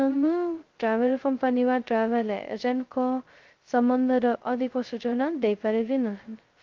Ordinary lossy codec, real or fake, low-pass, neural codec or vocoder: Opus, 32 kbps; fake; 7.2 kHz; codec, 16 kHz, 0.2 kbps, FocalCodec